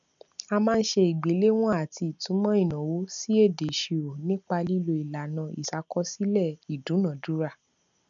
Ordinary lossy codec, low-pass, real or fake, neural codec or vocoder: none; 7.2 kHz; real; none